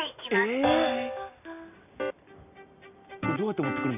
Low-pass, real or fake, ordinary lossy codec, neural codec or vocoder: 3.6 kHz; real; none; none